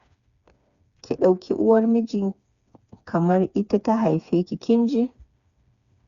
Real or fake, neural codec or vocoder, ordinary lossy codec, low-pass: fake; codec, 16 kHz, 4 kbps, FreqCodec, smaller model; Opus, 64 kbps; 7.2 kHz